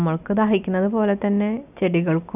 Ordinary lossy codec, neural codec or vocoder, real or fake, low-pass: none; none; real; 3.6 kHz